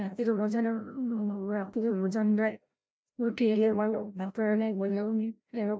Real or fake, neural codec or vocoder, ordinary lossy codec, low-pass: fake; codec, 16 kHz, 0.5 kbps, FreqCodec, larger model; none; none